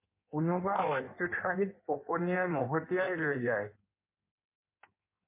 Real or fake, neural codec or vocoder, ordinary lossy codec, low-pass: fake; codec, 16 kHz in and 24 kHz out, 1.1 kbps, FireRedTTS-2 codec; MP3, 24 kbps; 3.6 kHz